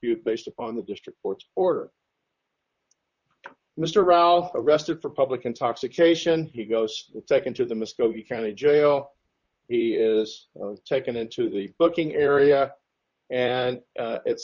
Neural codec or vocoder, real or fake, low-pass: vocoder, 44.1 kHz, 128 mel bands, Pupu-Vocoder; fake; 7.2 kHz